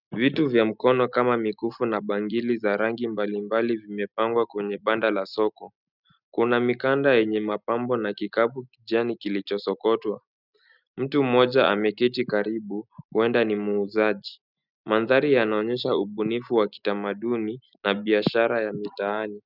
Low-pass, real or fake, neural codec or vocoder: 5.4 kHz; real; none